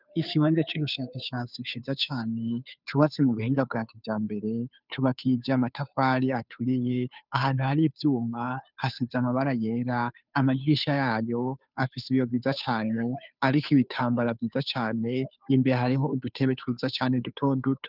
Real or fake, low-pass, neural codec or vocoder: fake; 5.4 kHz; codec, 16 kHz, 2 kbps, FunCodec, trained on Chinese and English, 25 frames a second